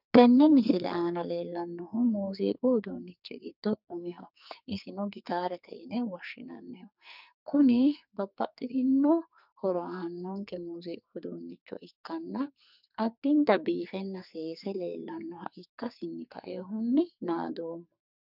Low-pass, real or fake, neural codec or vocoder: 5.4 kHz; fake; codec, 44.1 kHz, 2.6 kbps, SNAC